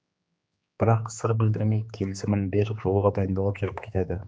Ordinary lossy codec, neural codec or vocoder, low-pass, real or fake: none; codec, 16 kHz, 2 kbps, X-Codec, HuBERT features, trained on general audio; none; fake